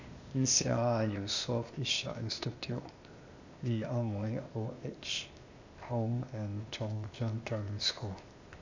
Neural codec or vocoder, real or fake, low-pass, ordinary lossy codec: codec, 16 kHz, 0.8 kbps, ZipCodec; fake; 7.2 kHz; none